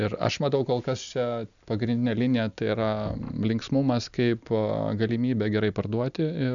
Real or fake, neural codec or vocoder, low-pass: real; none; 7.2 kHz